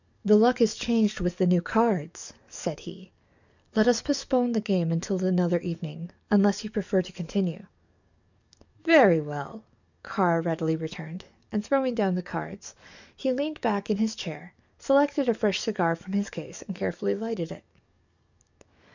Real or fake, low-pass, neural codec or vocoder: fake; 7.2 kHz; codec, 44.1 kHz, 7.8 kbps, DAC